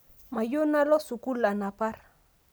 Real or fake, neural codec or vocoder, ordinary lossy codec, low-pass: real; none; none; none